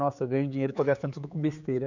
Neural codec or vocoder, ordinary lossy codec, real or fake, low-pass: codec, 16 kHz, 4 kbps, X-Codec, HuBERT features, trained on general audio; none; fake; 7.2 kHz